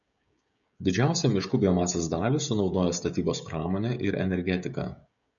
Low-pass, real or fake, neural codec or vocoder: 7.2 kHz; fake; codec, 16 kHz, 16 kbps, FreqCodec, smaller model